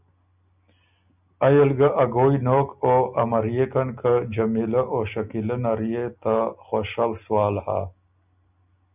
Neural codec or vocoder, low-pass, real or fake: none; 3.6 kHz; real